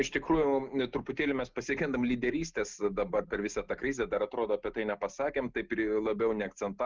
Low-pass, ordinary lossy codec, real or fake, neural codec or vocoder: 7.2 kHz; Opus, 32 kbps; real; none